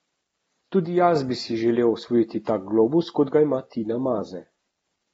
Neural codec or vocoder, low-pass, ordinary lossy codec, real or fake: none; 19.8 kHz; AAC, 24 kbps; real